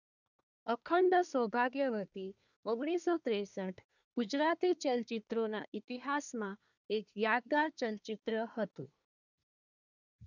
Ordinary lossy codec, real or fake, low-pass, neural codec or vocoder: none; fake; 7.2 kHz; codec, 24 kHz, 1 kbps, SNAC